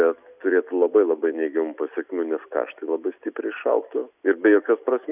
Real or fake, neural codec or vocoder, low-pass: real; none; 3.6 kHz